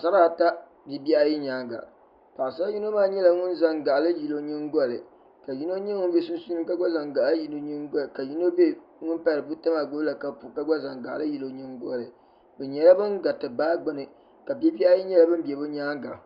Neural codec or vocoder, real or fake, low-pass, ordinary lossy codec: none; real; 5.4 kHz; Opus, 64 kbps